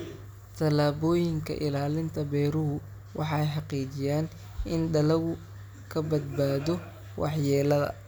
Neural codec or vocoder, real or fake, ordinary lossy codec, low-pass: none; real; none; none